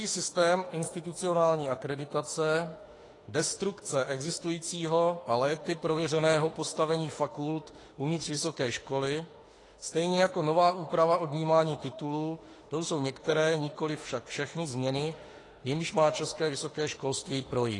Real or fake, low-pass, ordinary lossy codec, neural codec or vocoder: fake; 10.8 kHz; AAC, 32 kbps; autoencoder, 48 kHz, 32 numbers a frame, DAC-VAE, trained on Japanese speech